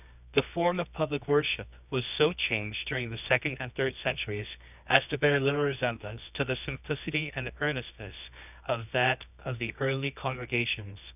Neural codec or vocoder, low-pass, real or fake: codec, 24 kHz, 0.9 kbps, WavTokenizer, medium music audio release; 3.6 kHz; fake